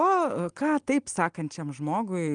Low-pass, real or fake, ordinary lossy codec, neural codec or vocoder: 9.9 kHz; real; Opus, 24 kbps; none